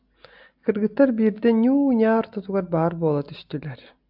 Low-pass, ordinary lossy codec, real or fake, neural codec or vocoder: 5.4 kHz; AAC, 48 kbps; real; none